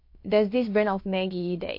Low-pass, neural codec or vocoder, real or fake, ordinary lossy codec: 5.4 kHz; codec, 16 kHz, about 1 kbps, DyCAST, with the encoder's durations; fake; MP3, 32 kbps